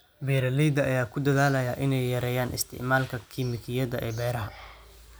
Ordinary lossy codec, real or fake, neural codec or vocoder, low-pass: none; real; none; none